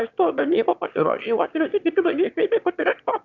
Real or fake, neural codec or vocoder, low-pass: fake; autoencoder, 22.05 kHz, a latent of 192 numbers a frame, VITS, trained on one speaker; 7.2 kHz